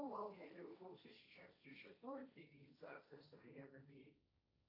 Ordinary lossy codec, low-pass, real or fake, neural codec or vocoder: AAC, 48 kbps; 5.4 kHz; fake; codec, 16 kHz, 1.1 kbps, Voila-Tokenizer